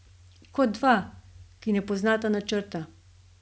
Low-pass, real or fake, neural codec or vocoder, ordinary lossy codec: none; real; none; none